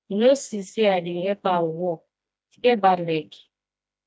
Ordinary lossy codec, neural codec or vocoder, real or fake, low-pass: none; codec, 16 kHz, 1 kbps, FreqCodec, smaller model; fake; none